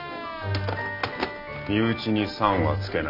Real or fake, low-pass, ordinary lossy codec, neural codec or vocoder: real; 5.4 kHz; none; none